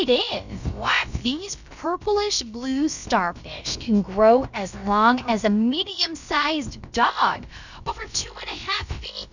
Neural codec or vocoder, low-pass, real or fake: codec, 16 kHz, about 1 kbps, DyCAST, with the encoder's durations; 7.2 kHz; fake